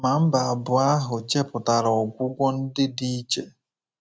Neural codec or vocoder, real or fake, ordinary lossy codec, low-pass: none; real; none; none